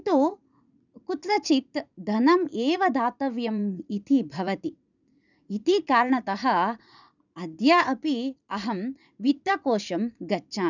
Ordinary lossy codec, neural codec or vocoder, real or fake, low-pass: none; vocoder, 44.1 kHz, 80 mel bands, Vocos; fake; 7.2 kHz